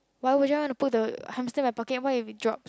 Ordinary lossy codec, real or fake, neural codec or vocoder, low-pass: none; real; none; none